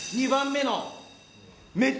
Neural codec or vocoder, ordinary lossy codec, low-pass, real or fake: none; none; none; real